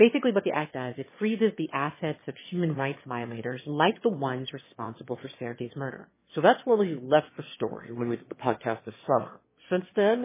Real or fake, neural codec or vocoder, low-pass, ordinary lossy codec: fake; autoencoder, 22.05 kHz, a latent of 192 numbers a frame, VITS, trained on one speaker; 3.6 kHz; MP3, 16 kbps